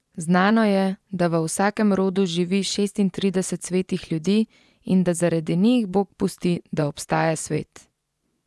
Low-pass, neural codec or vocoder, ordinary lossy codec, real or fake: none; vocoder, 24 kHz, 100 mel bands, Vocos; none; fake